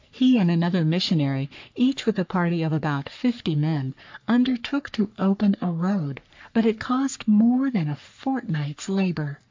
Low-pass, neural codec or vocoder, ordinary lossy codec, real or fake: 7.2 kHz; codec, 44.1 kHz, 3.4 kbps, Pupu-Codec; MP3, 48 kbps; fake